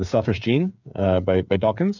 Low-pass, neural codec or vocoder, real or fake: 7.2 kHz; codec, 16 kHz, 8 kbps, FreqCodec, smaller model; fake